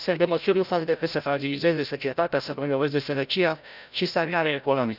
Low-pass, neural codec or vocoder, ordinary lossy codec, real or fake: 5.4 kHz; codec, 16 kHz, 0.5 kbps, FreqCodec, larger model; none; fake